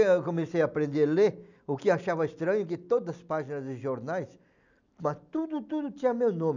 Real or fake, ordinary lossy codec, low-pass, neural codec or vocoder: real; none; 7.2 kHz; none